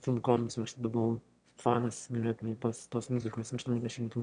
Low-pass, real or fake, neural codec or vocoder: 9.9 kHz; fake; autoencoder, 22.05 kHz, a latent of 192 numbers a frame, VITS, trained on one speaker